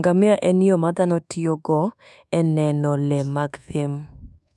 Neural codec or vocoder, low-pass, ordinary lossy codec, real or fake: codec, 24 kHz, 1.2 kbps, DualCodec; none; none; fake